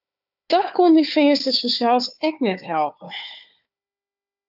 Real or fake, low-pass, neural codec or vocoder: fake; 5.4 kHz; codec, 16 kHz, 4 kbps, FunCodec, trained on Chinese and English, 50 frames a second